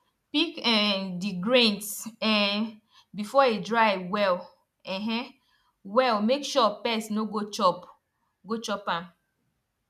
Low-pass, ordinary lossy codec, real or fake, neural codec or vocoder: 14.4 kHz; none; real; none